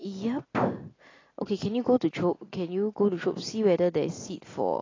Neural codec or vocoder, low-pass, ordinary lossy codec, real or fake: none; 7.2 kHz; AAC, 32 kbps; real